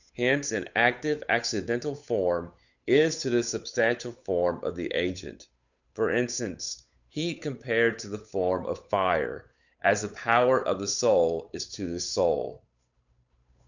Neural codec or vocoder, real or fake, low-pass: codec, 16 kHz, 8 kbps, FunCodec, trained on Chinese and English, 25 frames a second; fake; 7.2 kHz